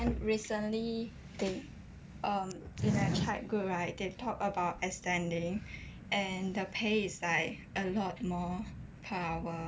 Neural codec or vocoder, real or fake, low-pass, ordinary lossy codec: none; real; none; none